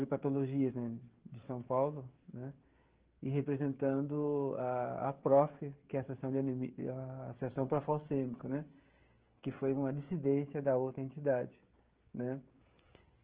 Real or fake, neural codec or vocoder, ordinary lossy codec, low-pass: fake; codec, 16 kHz, 16 kbps, FreqCodec, smaller model; Opus, 16 kbps; 3.6 kHz